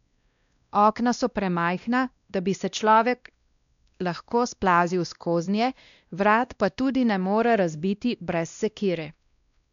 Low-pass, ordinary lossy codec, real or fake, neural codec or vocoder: 7.2 kHz; none; fake; codec, 16 kHz, 1 kbps, X-Codec, WavLM features, trained on Multilingual LibriSpeech